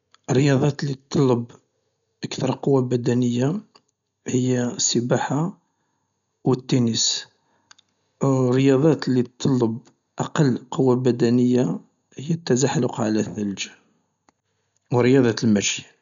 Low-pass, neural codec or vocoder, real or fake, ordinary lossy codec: 7.2 kHz; none; real; none